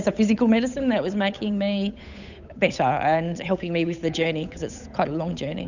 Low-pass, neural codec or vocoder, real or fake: 7.2 kHz; codec, 16 kHz, 8 kbps, FunCodec, trained on Chinese and English, 25 frames a second; fake